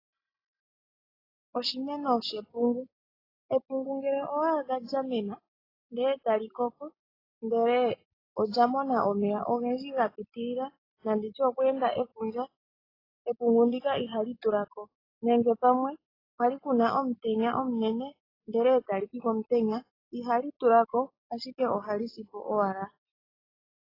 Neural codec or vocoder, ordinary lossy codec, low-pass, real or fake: none; AAC, 24 kbps; 5.4 kHz; real